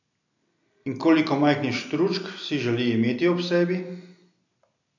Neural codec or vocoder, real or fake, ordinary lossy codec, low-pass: none; real; none; 7.2 kHz